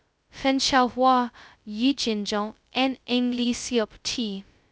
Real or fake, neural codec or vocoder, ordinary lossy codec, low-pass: fake; codec, 16 kHz, 0.2 kbps, FocalCodec; none; none